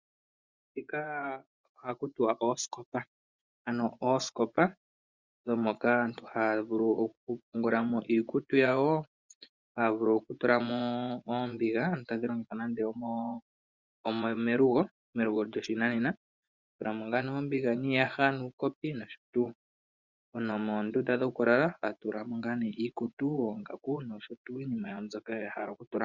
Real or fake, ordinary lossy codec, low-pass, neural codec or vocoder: fake; Opus, 64 kbps; 7.2 kHz; vocoder, 24 kHz, 100 mel bands, Vocos